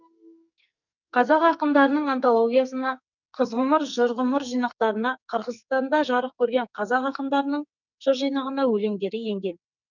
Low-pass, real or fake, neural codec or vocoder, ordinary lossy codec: 7.2 kHz; fake; codec, 44.1 kHz, 2.6 kbps, SNAC; none